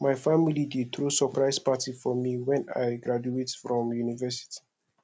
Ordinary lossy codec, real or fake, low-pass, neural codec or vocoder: none; real; none; none